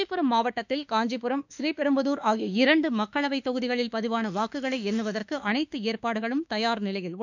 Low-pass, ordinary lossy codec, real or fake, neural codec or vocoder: 7.2 kHz; none; fake; autoencoder, 48 kHz, 32 numbers a frame, DAC-VAE, trained on Japanese speech